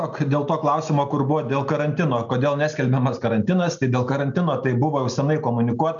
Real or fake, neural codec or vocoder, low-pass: real; none; 7.2 kHz